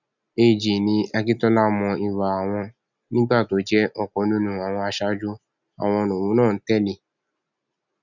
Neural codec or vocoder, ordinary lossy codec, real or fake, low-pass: none; none; real; 7.2 kHz